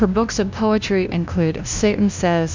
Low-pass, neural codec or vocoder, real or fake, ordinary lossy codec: 7.2 kHz; codec, 16 kHz, 0.5 kbps, FunCodec, trained on LibriTTS, 25 frames a second; fake; MP3, 64 kbps